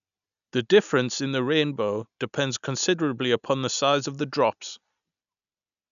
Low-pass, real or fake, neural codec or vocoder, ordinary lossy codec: 7.2 kHz; real; none; none